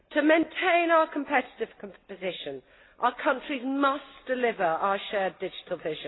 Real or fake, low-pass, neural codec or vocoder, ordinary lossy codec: real; 7.2 kHz; none; AAC, 16 kbps